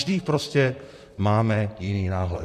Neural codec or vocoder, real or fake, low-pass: vocoder, 44.1 kHz, 128 mel bands, Pupu-Vocoder; fake; 14.4 kHz